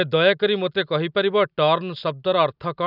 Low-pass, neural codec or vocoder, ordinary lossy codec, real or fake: 5.4 kHz; none; none; real